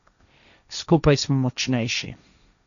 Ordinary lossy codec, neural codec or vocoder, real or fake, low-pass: none; codec, 16 kHz, 1.1 kbps, Voila-Tokenizer; fake; 7.2 kHz